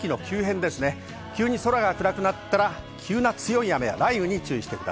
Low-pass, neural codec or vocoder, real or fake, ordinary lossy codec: none; none; real; none